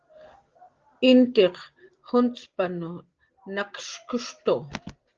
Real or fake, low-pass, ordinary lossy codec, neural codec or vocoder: real; 7.2 kHz; Opus, 16 kbps; none